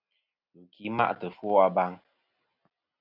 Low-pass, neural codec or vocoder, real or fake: 5.4 kHz; none; real